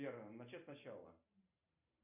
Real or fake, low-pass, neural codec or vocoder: fake; 3.6 kHz; vocoder, 44.1 kHz, 128 mel bands every 512 samples, BigVGAN v2